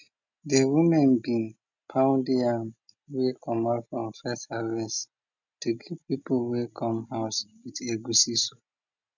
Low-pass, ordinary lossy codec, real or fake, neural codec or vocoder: 7.2 kHz; none; real; none